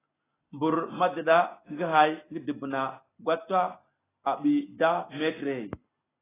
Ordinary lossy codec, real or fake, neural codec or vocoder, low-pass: AAC, 16 kbps; real; none; 3.6 kHz